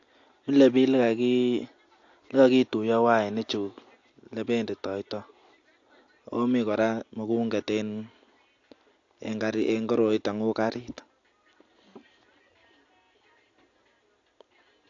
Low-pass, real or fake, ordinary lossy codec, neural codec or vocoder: 7.2 kHz; real; AAC, 48 kbps; none